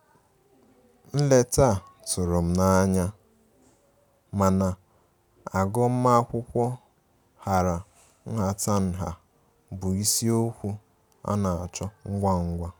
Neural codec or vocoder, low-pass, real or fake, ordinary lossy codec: none; none; real; none